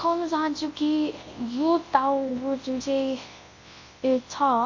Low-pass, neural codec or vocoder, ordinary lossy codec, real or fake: 7.2 kHz; codec, 24 kHz, 0.9 kbps, WavTokenizer, large speech release; MP3, 32 kbps; fake